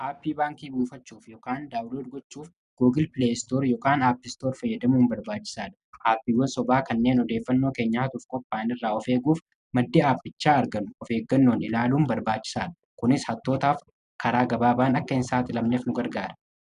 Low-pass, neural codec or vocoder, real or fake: 10.8 kHz; none; real